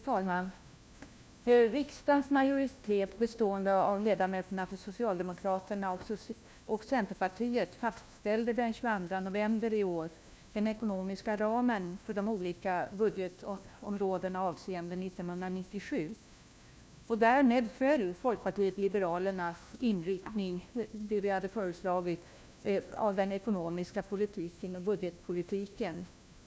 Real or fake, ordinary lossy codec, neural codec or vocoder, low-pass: fake; none; codec, 16 kHz, 1 kbps, FunCodec, trained on LibriTTS, 50 frames a second; none